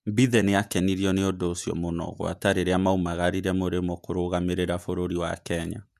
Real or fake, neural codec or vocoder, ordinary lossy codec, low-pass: fake; vocoder, 48 kHz, 128 mel bands, Vocos; none; 14.4 kHz